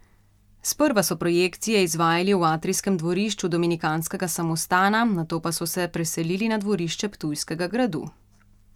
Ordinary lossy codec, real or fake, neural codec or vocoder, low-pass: none; real; none; 19.8 kHz